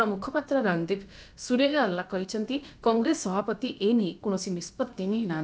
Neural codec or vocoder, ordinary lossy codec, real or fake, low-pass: codec, 16 kHz, about 1 kbps, DyCAST, with the encoder's durations; none; fake; none